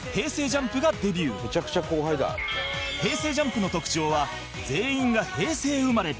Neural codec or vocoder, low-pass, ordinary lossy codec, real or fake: none; none; none; real